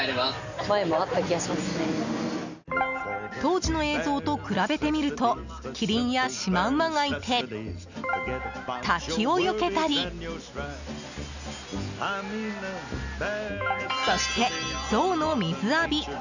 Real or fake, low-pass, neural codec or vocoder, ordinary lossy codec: real; 7.2 kHz; none; none